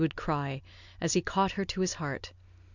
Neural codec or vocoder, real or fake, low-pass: none; real; 7.2 kHz